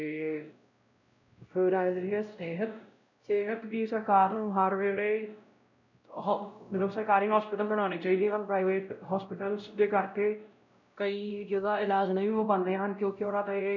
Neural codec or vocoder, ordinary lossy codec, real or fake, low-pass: codec, 16 kHz, 0.5 kbps, X-Codec, WavLM features, trained on Multilingual LibriSpeech; none; fake; 7.2 kHz